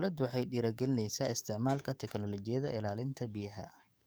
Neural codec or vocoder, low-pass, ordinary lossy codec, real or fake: codec, 44.1 kHz, 7.8 kbps, DAC; none; none; fake